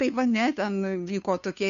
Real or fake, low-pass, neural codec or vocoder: fake; 7.2 kHz; codec, 16 kHz, 2 kbps, FunCodec, trained on Chinese and English, 25 frames a second